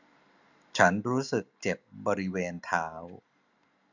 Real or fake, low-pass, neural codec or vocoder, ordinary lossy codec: real; 7.2 kHz; none; none